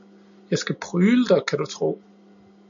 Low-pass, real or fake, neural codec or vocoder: 7.2 kHz; real; none